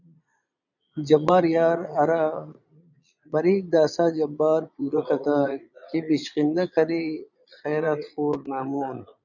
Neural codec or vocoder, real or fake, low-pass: vocoder, 22.05 kHz, 80 mel bands, Vocos; fake; 7.2 kHz